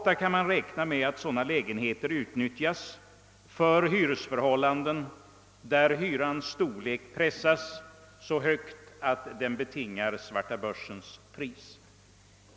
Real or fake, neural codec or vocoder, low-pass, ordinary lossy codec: real; none; none; none